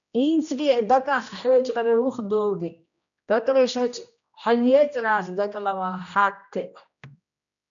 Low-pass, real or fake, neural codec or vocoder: 7.2 kHz; fake; codec, 16 kHz, 1 kbps, X-Codec, HuBERT features, trained on general audio